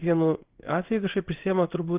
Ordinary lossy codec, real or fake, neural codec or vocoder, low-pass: Opus, 32 kbps; fake; codec, 16 kHz in and 24 kHz out, 1 kbps, XY-Tokenizer; 3.6 kHz